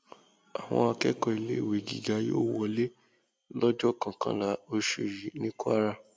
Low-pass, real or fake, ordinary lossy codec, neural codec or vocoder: none; real; none; none